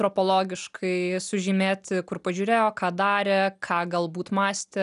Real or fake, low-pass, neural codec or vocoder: real; 10.8 kHz; none